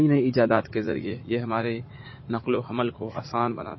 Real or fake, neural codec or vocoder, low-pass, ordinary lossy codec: fake; vocoder, 44.1 kHz, 80 mel bands, Vocos; 7.2 kHz; MP3, 24 kbps